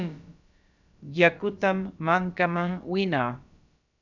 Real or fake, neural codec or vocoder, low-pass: fake; codec, 16 kHz, about 1 kbps, DyCAST, with the encoder's durations; 7.2 kHz